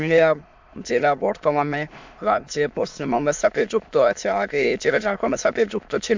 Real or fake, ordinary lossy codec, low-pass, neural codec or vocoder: fake; MP3, 64 kbps; 7.2 kHz; autoencoder, 22.05 kHz, a latent of 192 numbers a frame, VITS, trained on many speakers